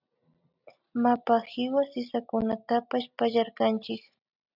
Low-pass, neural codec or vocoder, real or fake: 5.4 kHz; none; real